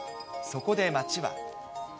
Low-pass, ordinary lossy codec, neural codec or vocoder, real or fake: none; none; none; real